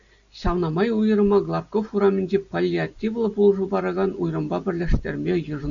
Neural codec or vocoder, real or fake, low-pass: none; real; 7.2 kHz